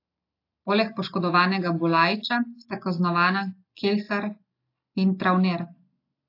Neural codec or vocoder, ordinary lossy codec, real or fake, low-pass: none; AAC, 32 kbps; real; 5.4 kHz